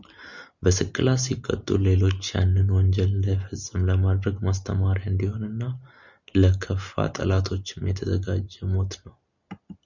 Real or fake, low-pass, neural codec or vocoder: real; 7.2 kHz; none